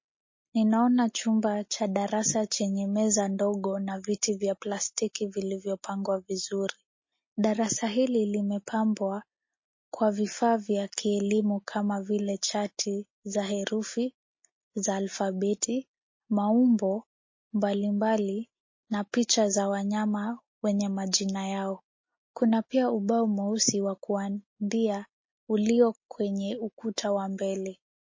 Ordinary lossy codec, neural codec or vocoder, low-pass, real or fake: MP3, 32 kbps; none; 7.2 kHz; real